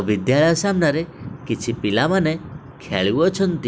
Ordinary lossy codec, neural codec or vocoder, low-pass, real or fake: none; none; none; real